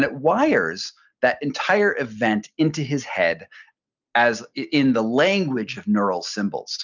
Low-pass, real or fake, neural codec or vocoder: 7.2 kHz; real; none